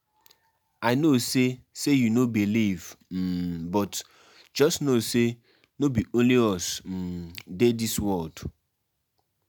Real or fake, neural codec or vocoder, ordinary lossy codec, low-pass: real; none; none; none